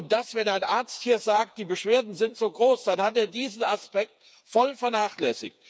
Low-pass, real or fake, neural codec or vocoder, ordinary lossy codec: none; fake; codec, 16 kHz, 4 kbps, FreqCodec, smaller model; none